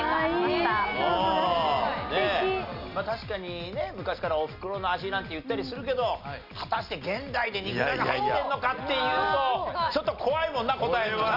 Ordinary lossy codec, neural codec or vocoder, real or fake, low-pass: AAC, 48 kbps; none; real; 5.4 kHz